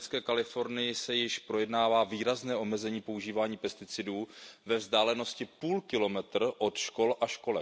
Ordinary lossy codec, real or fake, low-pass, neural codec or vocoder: none; real; none; none